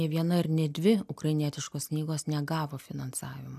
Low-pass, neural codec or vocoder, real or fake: 14.4 kHz; none; real